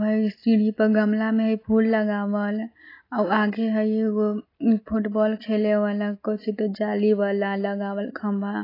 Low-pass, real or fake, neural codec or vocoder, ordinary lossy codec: 5.4 kHz; real; none; AAC, 24 kbps